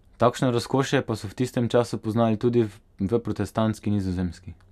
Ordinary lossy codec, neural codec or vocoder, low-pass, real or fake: none; none; 14.4 kHz; real